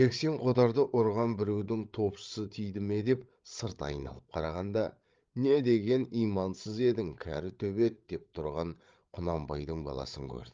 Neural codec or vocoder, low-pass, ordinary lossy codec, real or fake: codec, 16 kHz, 8 kbps, FreqCodec, larger model; 7.2 kHz; Opus, 32 kbps; fake